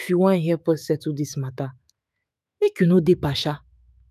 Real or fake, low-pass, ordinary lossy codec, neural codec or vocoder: fake; 14.4 kHz; none; autoencoder, 48 kHz, 128 numbers a frame, DAC-VAE, trained on Japanese speech